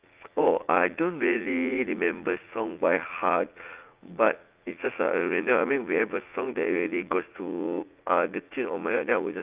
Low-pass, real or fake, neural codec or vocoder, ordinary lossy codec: 3.6 kHz; fake; vocoder, 44.1 kHz, 80 mel bands, Vocos; Opus, 24 kbps